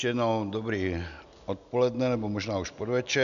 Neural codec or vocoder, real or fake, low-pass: none; real; 7.2 kHz